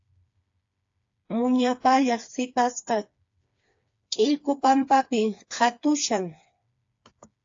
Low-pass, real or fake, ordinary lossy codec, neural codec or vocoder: 7.2 kHz; fake; AAC, 48 kbps; codec, 16 kHz, 4 kbps, FreqCodec, smaller model